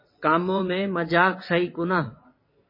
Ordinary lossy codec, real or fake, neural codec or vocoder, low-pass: MP3, 24 kbps; fake; vocoder, 22.05 kHz, 80 mel bands, WaveNeXt; 5.4 kHz